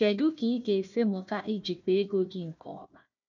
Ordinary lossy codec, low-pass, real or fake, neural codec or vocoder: none; 7.2 kHz; fake; codec, 16 kHz, 1 kbps, FunCodec, trained on Chinese and English, 50 frames a second